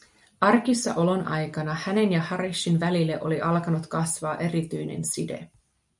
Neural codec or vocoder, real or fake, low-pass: none; real; 10.8 kHz